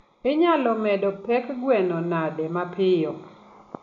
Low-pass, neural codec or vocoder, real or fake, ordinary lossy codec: 7.2 kHz; none; real; none